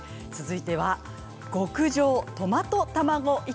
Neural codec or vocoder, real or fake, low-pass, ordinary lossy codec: none; real; none; none